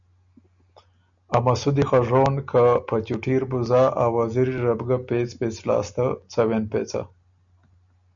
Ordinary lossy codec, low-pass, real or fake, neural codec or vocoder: MP3, 96 kbps; 7.2 kHz; real; none